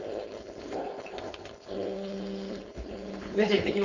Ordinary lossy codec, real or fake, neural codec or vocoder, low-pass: Opus, 64 kbps; fake; codec, 16 kHz, 4.8 kbps, FACodec; 7.2 kHz